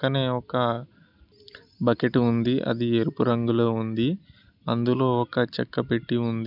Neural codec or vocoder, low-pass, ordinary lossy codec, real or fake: none; 5.4 kHz; none; real